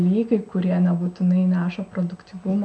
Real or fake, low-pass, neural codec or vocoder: real; 9.9 kHz; none